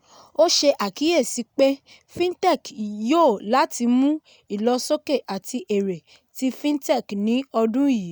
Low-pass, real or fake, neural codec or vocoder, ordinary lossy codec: none; real; none; none